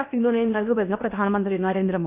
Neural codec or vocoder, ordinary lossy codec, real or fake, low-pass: codec, 16 kHz in and 24 kHz out, 0.8 kbps, FocalCodec, streaming, 65536 codes; none; fake; 3.6 kHz